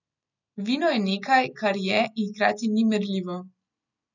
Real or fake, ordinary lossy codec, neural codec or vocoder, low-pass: real; none; none; 7.2 kHz